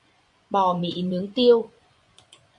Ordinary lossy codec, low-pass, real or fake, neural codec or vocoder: AAC, 64 kbps; 10.8 kHz; fake; vocoder, 24 kHz, 100 mel bands, Vocos